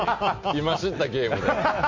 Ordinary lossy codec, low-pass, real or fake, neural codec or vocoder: MP3, 48 kbps; 7.2 kHz; real; none